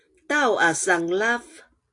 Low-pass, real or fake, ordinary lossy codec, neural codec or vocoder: 10.8 kHz; real; AAC, 48 kbps; none